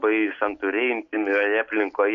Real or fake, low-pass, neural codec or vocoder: real; 7.2 kHz; none